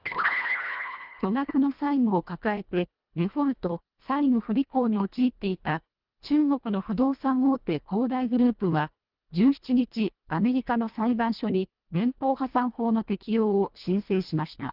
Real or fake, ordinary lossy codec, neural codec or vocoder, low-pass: fake; Opus, 32 kbps; codec, 24 kHz, 1.5 kbps, HILCodec; 5.4 kHz